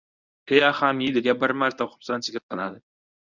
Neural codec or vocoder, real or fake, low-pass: codec, 24 kHz, 0.9 kbps, WavTokenizer, medium speech release version 1; fake; 7.2 kHz